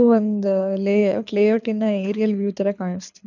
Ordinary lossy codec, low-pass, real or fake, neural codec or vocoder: none; 7.2 kHz; fake; codec, 24 kHz, 6 kbps, HILCodec